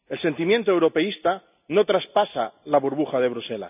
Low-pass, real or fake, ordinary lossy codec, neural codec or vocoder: 3.6 kHz; real; none; none